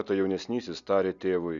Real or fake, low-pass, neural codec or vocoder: real; 7.2 kHz; none